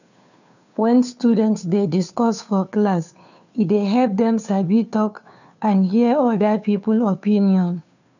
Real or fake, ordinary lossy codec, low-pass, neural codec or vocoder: fake; none; 7.2 kHz; codec, 16 kHz, 2 kbps, FunCodec, trained on Chinese and English, 25 frames a second